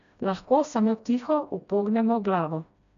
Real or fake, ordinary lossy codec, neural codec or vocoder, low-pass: fake; none; codec, 16 kHz, 1 kbps, FreqCodec, smaller model; 7.2 kHz